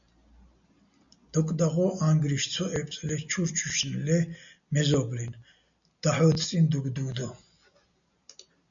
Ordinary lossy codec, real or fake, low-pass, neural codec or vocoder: MP3, 96 kbps; real; 7.2 kHz; none